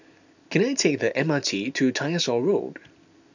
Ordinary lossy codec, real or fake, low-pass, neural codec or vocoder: none; real; 7.2 kHz; none